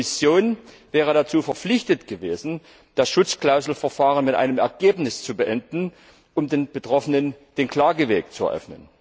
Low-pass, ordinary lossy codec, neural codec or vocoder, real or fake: none; none; none; real